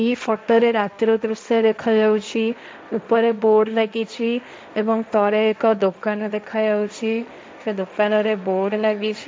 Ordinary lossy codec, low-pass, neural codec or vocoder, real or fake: none; none; codec, 16 kHz, 1.1 kbps, Voila-Tokenizer; fake